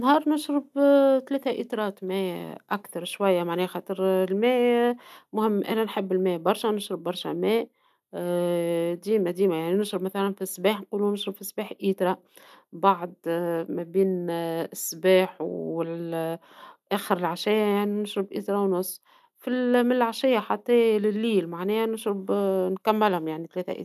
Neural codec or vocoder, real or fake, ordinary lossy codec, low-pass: none; real; none; 14.4 kHz